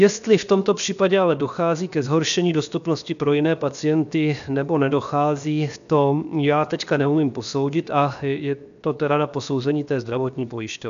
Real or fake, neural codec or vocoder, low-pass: fake; codec, 16 kHz, 0.7 kbps, FocalCodec; 7.2 kHz